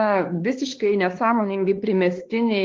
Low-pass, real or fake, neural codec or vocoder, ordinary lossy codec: 7.2 kHz; fake; codec, 16 kHz, 2 kbps, X-Codec, WavLM features, trained on Multilingual LibriSpeech; Opus, 16 kbps